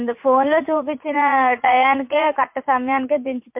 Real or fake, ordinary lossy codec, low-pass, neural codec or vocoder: fake; MP3, 32 kbps; 3.6 kHz; vocoder, 44.1 kHz, 80 mel bands, Vocos